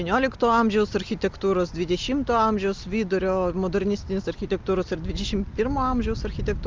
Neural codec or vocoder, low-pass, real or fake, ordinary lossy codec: none; 7.2 kHz; real; Opus, 32 kbps